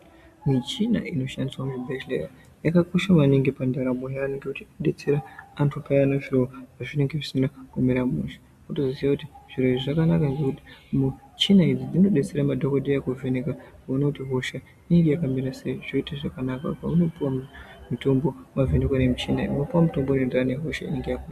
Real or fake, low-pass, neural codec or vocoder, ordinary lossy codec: real; 14.4 kHz; none; MP3, 96 kbps